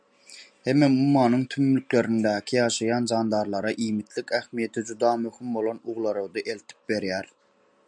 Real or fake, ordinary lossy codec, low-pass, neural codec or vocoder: real; MP3, 96 kbps; 9.9 kHz; none